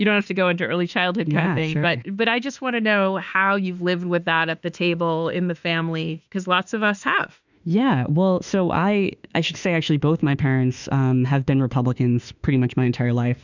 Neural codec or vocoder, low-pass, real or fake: autoencoder, 48 kHz, 32 numbers a frame, DAC-VAE, trained on Japanese speech; 7.2 kHz; fake